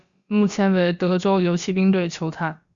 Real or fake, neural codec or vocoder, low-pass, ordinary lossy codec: fake; codec, 16 kHz, about 1 kbps, DyCAST, with the encoder's durations; 7.2 kHz; Opus, 64 kbps